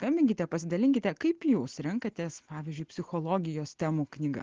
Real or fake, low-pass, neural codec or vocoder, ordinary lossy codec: real; 7.2 kHz; none; Opus, 16 kbps